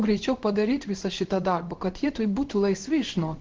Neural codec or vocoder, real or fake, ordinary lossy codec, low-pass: codec, 16 kHz in and 24 kHz out, 1 kbps, XY-Tokenizer; fake; Opus, 16 kbps; 7.2 kHz